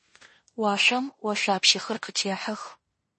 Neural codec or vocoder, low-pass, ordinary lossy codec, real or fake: codec, 16 kHz in and 24 kHz out, 0.9 kbps, LongCat-Audio-Codec, fine tuned four codebook decoder; 10.8 kHz; MP3, 32 kbps; fake